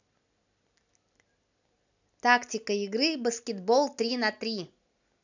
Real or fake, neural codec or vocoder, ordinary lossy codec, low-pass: real; none; none; 7.2 kHz